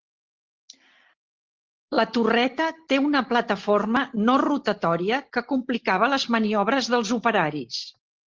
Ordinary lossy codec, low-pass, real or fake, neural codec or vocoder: Opus, 16 kbps; 7.2 kHz; real; none